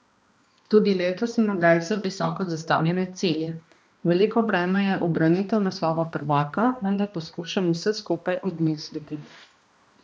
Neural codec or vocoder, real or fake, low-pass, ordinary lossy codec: codec, 16 kHz, 1 kbps, X-Codec, HuBERT features, trained on balanced general audio; fake; none; none